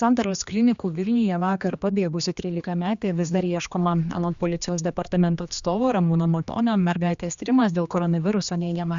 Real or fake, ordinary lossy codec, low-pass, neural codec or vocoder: fake; Opus, 64 kbps; 7.2 kHz; codec, 16 kHz, 2 kbps, X-Codec, HuBERT features, trained on general audio